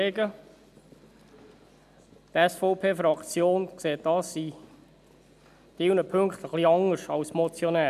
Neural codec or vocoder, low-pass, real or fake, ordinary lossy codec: none; 14.4 kHz; real; none